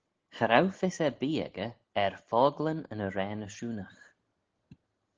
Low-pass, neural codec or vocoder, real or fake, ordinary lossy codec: 7.2 kHz; none; real; Opus, 16 kbps